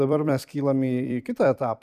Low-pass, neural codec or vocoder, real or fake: 14.4 kHz; vocoder, 44.1 kHz, 128 mel bands every 256 samples, BigVGAN v2; fake